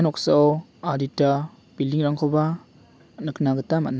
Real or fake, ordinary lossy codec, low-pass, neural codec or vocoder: real; none; none; none